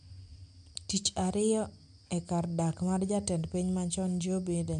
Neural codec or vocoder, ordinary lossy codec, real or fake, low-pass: none; MP3, 64 kbps; real; 9.9 kHz